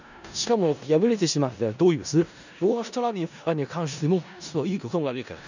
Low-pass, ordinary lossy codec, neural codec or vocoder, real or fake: 7.2 kHz; none; codec, 16 kHz in and 24 kHz out, 0.4 kbps, LongCat-Audio-Codec, four codebook decoder; fake